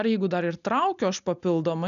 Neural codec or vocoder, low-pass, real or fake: none; 7.2 kHz; real